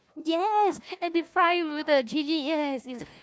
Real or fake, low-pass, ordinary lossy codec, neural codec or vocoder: fake; none; none; codec, 16 kHz, 1 kbps, FunCodec, trained on Chinese and English, 50 frames a second